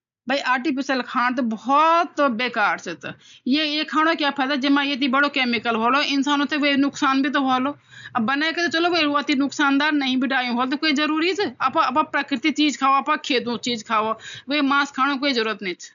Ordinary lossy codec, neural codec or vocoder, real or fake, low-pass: MP3, 96 kbps; none; real; 7.2 kHz